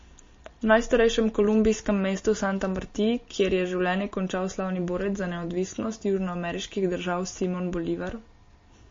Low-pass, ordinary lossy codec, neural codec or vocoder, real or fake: 7.2 kHz; MP3, 32 kbps; none; real